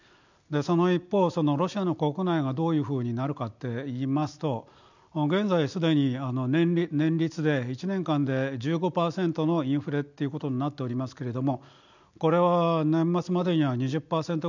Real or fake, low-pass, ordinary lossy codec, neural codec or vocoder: real; 7.2 kHz; none; none